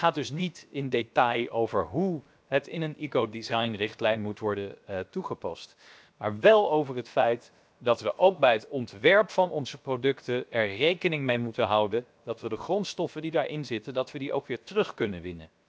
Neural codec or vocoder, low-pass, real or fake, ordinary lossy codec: codec, 16 kHz, 0.7 kbps, FocalCodec; none; fake; none